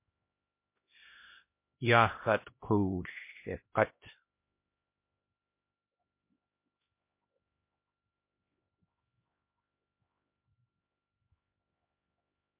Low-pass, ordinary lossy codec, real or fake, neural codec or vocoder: 3.6 kHz; MP3, 24 kbps; fake; codec, 16 kHz, 1 kbps, X-Codec, HuBERT features, trained on LibriSpeech